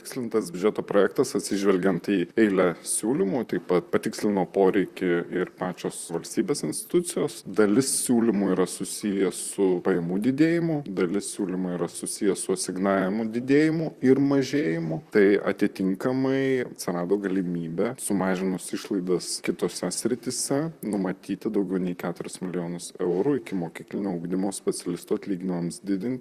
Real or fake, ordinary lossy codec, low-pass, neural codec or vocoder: fake; Opus, 64 kbps; 14.4 kHz; vocoder, 44.1 kHz, 128 mel bands, Pupu-Vocoder